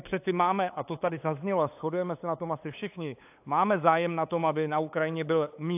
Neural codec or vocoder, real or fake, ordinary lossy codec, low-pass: codec, 16 kHz, 8 kbps, FunCodec, trained on LibriTTS, 25 frames a second; fake; AAC, 32 kbps; 3.6 kHz